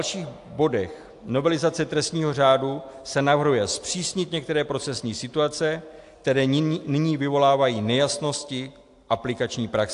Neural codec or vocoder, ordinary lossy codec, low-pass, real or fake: none; AAC, 64 kbps; 10.8 kHz; real